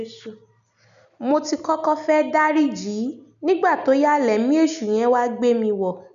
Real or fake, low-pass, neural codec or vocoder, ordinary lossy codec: real; 7.2 kHz; none; none